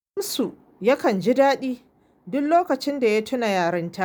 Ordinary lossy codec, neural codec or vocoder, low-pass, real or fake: none; none; none; real